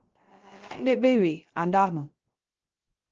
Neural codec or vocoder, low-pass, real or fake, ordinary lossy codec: codec, 16 kHz, 0.3 kbps, FocalCodec; 7.2 kHz; fake; Opus, 16 kbps